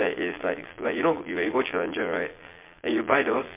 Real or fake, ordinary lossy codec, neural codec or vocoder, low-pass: fake; MP3, 24 kbps; vocoder, 22.05 kHz, 80 mel bands, Vocos; 3.6 kHz